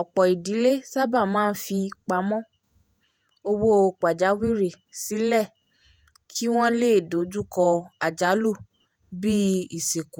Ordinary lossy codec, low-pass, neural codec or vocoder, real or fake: none; none; vocoder, 48 kHz, 128 mel bands, Vocos; fake